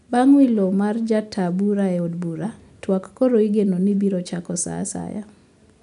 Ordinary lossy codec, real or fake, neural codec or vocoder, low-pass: none; real; none; 10.8 kHz